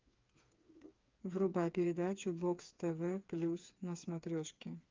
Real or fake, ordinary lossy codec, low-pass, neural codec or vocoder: fake; Opus, 24 kbps; 7.2 kHz; codec, 16 kHz, 4 kbps, FreqCodec, smaller model